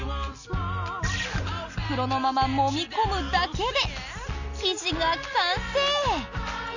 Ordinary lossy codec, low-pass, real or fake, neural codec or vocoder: none; 7.2 kHz; real; none